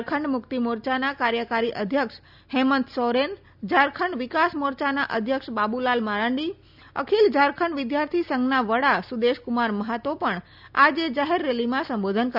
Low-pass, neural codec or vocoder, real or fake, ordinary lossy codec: 5.4 kHz; none; real; none